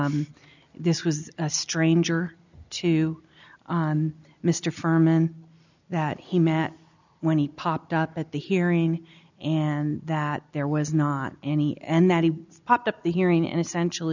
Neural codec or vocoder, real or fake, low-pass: none; real; 7.2 kHz